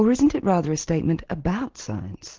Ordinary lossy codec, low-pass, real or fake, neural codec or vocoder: Opus, 16 kbps; 7.2 kHz; real; none